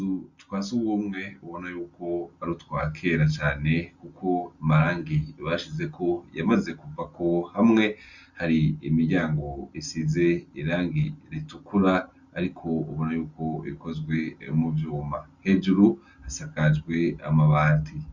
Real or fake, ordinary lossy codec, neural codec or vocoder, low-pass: real; Opus, 64 kbps; none; 7.2 kHz